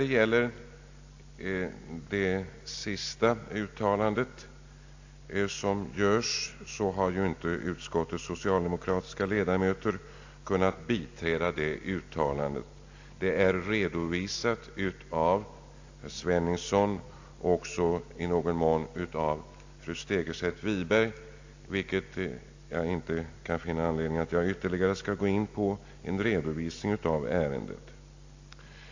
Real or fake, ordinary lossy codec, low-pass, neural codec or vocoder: real; AAC, 48 kbps; 7.2 kHz; none